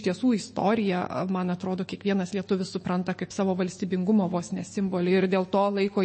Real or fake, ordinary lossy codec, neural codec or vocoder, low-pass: fake; MP3, 32 kbps; autoencoder, 48 kHz, 128 numbers a frame, DAC-VAE, trained on Japanese speech; 9.9 kHz